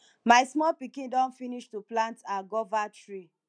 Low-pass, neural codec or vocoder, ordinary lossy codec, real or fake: 9.9 kHz; none; none; real